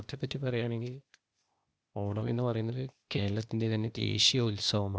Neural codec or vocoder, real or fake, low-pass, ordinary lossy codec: codec, 16 kHz, 0.8 kbps, ZipCodec; fake; none; none